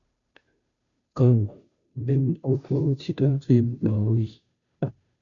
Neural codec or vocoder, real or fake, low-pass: codec, 16 kHz, 0.5 kbps, FunCodec, trained on Chinese and English, 25 frames a second; fake; 7.2 kHz